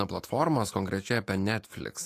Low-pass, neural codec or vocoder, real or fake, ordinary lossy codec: 14.4 kHz; autoencoder, 48 kHz, 128 numbers a frame, DAC-VAE, trained on Japanese speech; fake; AAC, 48 kbps